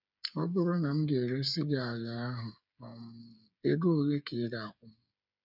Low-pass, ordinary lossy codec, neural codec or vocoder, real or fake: 5.4 kHz; none; codec, 16 kHz, 8 kbps, FreqCodec, smaller model; fake